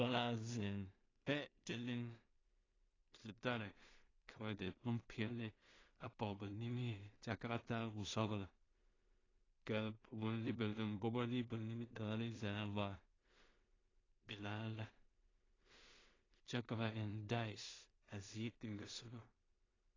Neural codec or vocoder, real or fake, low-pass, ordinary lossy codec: codec, 16 kHz in and 24 kHz out, 0.4 kbps, LongCat-Audio-Codec, two codebook decoder; fake; 7.2 kHz; AAC, 32 kbps